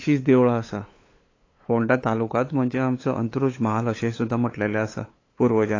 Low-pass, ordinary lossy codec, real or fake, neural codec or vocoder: 7.2 kHz; AAC, 32 kbps; fake; codec, 16 kHz, 8 kbps, FunCodec, trained on LibriTTS, 25 frames a second